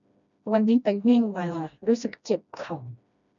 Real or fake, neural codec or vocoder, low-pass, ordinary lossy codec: fake; codec, 16 kHz, 1 kbps, FreqCodec, smaller model; 7.2 kHz; none